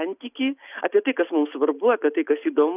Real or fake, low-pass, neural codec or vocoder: real; 3.6 kHz; none